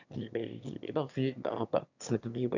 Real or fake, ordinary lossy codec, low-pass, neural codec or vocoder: fake; none; 7.2 kHz; autoencoder, 22.05 kHz, a latent of 192 numbers a frame, VITS, trained on one speaker